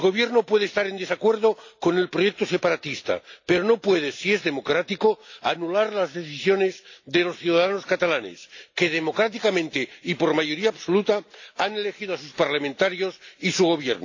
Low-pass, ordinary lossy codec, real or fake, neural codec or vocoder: 7.2 kHz; AAC, 48 kbps; real; none